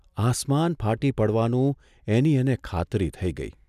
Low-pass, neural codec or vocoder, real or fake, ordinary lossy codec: 14.4 kHz; none; real; none